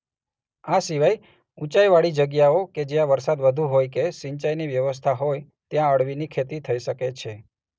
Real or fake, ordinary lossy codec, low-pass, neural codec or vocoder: real; none; none; none